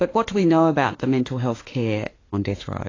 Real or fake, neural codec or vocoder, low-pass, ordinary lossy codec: fake; autoencoder, 48 kHz, 32 numbers a frame, DAC-VAE, trained on Japanese speech; 7.2 kHz; AAC, 32 kbps